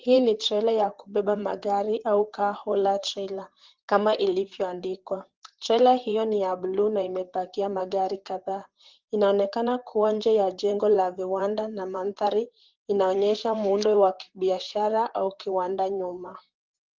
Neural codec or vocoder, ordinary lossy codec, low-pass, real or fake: vocoder, 44.1 kHz, 128 mel bands, Pupu-Vocoder; Opus, 16 kbps; 7.2 kHz; fake